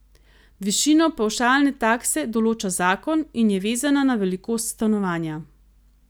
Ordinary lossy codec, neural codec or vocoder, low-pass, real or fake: none; none; none; real